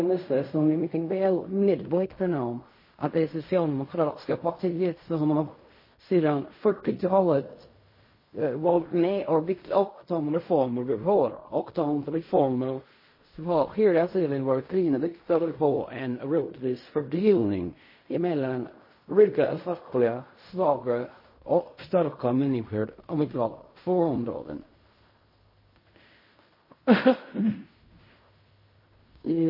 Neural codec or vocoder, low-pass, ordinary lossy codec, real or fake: codec, 16 kHz in and 24 kHz out, 0.4 kbps, LongCat-Audio-Codec, fine tuned four codebook decoder; 5.4 kHz; MP3, 24 kbps; fake